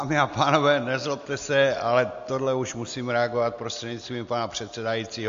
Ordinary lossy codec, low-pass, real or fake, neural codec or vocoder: MP3, 48 kbps; 7.2 kHz; real; none